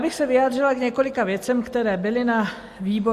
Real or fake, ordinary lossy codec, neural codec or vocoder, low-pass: real; Opus, 64 kbps; none; 14.4 kHz